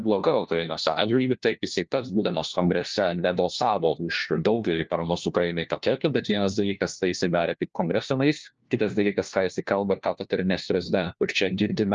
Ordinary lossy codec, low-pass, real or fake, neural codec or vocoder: Opus, 24 kbps; 7.2 kHz; fake; codec, 16 kHz, 1 kbps, FunCodec, trained on LibriTTS, 50 frames a second